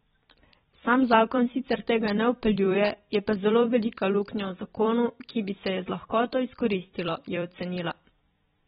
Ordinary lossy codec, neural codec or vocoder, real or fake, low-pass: AAC, 16 kbps; vocoder, 44.1 kHz, 128 mel bands every 256 samples, BigVGAN v2; fake; 19.8 kHz